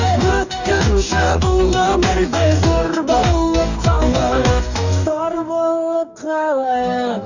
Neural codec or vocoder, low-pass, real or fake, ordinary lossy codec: codec, 44.1 kHz, 2.6 kbps, DAC; 7.2 kHz; fake; none